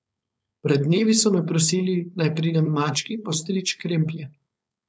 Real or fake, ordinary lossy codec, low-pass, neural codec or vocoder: fake; none; none; codec, 16 kHz, 4.8 kbps, FACodec